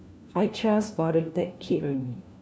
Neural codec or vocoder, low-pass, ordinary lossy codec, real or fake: codec, 16 kHz, 1 kbps, FunCodec, trained on LibriTTS, 50 frames a second; none; none; fake